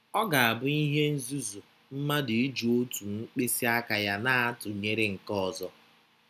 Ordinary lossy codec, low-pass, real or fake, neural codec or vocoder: none; 14.4 kHz; real; none